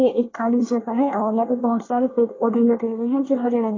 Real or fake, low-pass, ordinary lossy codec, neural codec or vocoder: fake; 7.2 kHz; AAC, 32 kbps; codec, 24 kHz, 1 kbps, SNAC